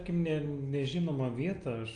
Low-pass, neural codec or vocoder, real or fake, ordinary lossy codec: 9.9 kHz; none; real; Opus, 32 kbps